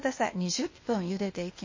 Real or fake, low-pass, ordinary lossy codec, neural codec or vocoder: fake; 7.2 kHz; MP3, 32 kbps; codec, 16 kHz, 0.8 kbps, ZipCodec